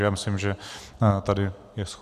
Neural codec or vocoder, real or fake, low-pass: none; real; 14.4 kHz